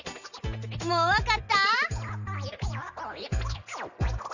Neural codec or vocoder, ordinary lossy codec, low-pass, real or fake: none; none; 7.2 kHz; real